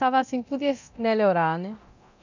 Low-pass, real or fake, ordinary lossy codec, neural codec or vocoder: 7.2 kHz; fake; none; codec, 24 kHz, 0.9 kbps, DualCodec